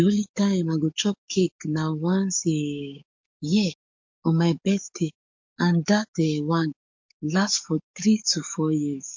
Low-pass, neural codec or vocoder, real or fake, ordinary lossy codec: 7.2 kHz; codec, 44.1 kHz, 7.8 kbps, DAC; fake; MP3, 48 kbps